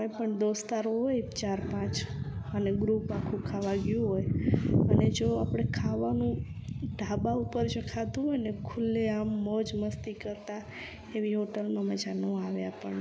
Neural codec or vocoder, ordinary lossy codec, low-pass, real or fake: none; none; none; real